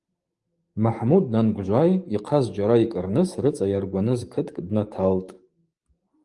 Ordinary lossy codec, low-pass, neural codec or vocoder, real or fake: Opus, 32 kbps; 10.8 kHz; codec, 44.1 kHz, 7.8 kbps, DAC; fake